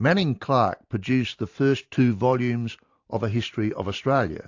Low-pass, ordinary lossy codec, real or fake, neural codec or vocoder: 7.2 kHz; AAC, 48 kbps; fake; vocoder, 44.1 kHz, 128 mel bands every 512 samples, BigVGAN v2